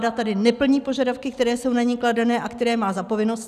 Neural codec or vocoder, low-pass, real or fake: none; 14.4 kHz; real